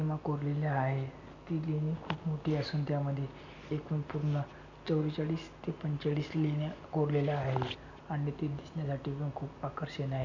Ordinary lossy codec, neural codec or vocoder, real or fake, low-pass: AAC, 48 kbps; none; real; 7.2 kHz